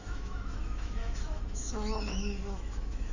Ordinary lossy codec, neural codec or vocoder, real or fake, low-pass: none; autoencoder, 48 kHz, 128 numbers a frame, DAC-VAE, trained on Japanese speech; fake; 7.2 kHz